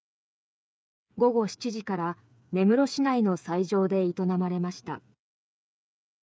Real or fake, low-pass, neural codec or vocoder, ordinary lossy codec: fake; none; codec, 16 kHz, 8 kbps, FreqCodec, smaller model; none